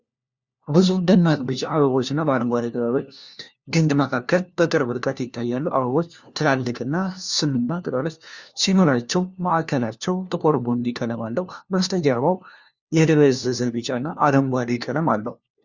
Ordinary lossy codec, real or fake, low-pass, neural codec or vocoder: Opus, 64 kbps; fake; 7.2 kHz; codec, 16 kHz, 1 kbps, FunCodec, trained on LibriTTS, 50 frames a second